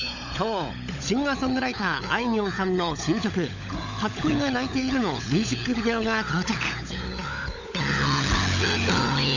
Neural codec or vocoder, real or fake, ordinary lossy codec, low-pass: codec, 16 kHz, 16 kbps, FunCodec, trained on LibriTTS, 50 frames a second; fake; none; 7.2 kHz